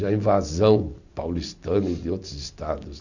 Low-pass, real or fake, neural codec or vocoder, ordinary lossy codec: 7.2 kHz; real; none; none